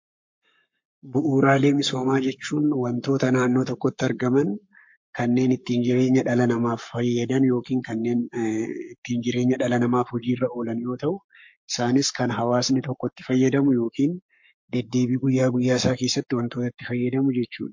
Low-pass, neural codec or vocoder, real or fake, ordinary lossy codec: 7.2 kHz; codec, 44.1 kHz, 7.8 kbps, Pupu-Codec; fake; MP3, 48 kbps